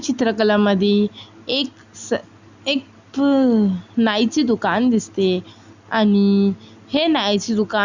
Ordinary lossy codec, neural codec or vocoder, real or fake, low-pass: Opus, 64 kbps; none; real; 7.2 kHz